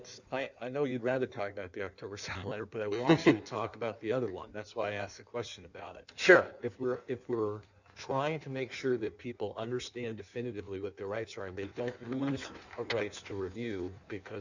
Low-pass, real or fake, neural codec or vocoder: 7.2 kHz; fake; codec, 16 kHz in and 24 kHz out, 1.1 kbps, FireRedTTS-2 codec